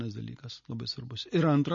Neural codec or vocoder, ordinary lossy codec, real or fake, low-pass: none; MP3, 32 kbps; real; 7.2 kHz